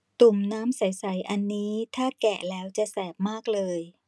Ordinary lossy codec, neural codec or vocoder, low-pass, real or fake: none; none; none; real